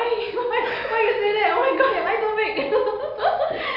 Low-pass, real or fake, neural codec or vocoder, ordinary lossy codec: 5.4 kHz; real; none; none